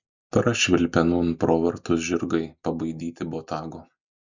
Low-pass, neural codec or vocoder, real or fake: 7.2 kHz; none; real